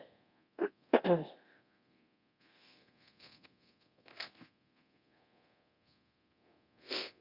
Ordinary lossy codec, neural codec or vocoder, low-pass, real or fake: Opus, 64 kbps; codec, 24 kHz, 0.9 kbps, DualCodec; 5.4 kHz; fake